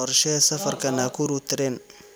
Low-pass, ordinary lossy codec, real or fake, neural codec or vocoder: none; none; fake; vocoder, 44.1 kHz, 128 mel bands every 256 samples, BigVGAN v2